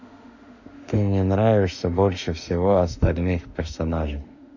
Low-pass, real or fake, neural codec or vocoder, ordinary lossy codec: 7.2 kHz; fake; autoencoder, 48 kHz, 32 numbers a frame, DAC-VAE, trained on Japanese speech; none